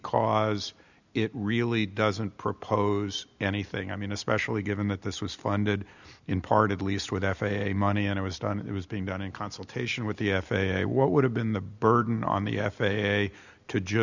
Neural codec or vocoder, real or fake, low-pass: none; real; 7.2 kHz